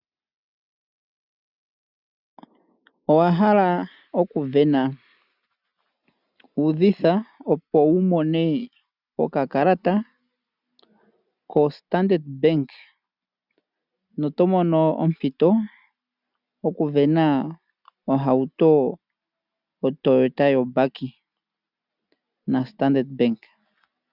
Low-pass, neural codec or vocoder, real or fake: 5.4 kHz; none; real